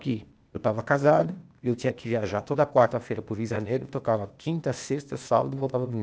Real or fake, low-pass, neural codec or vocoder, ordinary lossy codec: fake; none; codec, 16 kHz, 0.8 kbps, ZipCodec; none